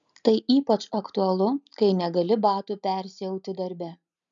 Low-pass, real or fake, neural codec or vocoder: 7.2 kHz; real; none